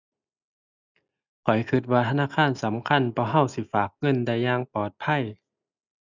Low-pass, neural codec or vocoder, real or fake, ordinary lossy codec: 7.2 kHz; none; real; none